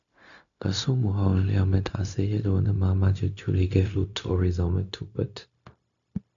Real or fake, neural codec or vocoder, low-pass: fake; codec, 16 kHz, 0.4 kbps, LongCat-Audio-Codec; 7.2 kHz